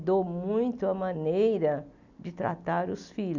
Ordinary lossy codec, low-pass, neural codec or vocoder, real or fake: none; 7.2 kHz; none; real